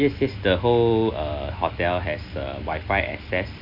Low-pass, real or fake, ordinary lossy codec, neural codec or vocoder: 5.4 kHz; real; MP3, 32 kbps; none